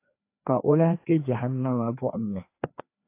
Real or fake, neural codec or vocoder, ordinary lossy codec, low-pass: fake; codec, 16 kHz, 2 kbps, FreqCodec, larger model; AAC, 24 kbps; 3.6 kHz